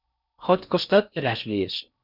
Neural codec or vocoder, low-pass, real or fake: codec, 16 kHz in and 24 kHz out, 0.6 kbps, FocalCodec, streaming, 4096 codes; 5.4 kHz; fake